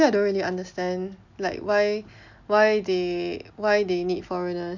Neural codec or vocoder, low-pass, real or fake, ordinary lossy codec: none; 7.2 kHz; real; none